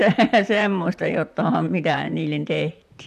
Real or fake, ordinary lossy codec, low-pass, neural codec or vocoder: fake; none; 14.4 kHz; vocoder, 44.1 kHz, 128 mel bands, Pupu-Vocoder